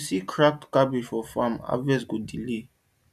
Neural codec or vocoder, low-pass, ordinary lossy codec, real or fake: none; 14.4 kHz; none; real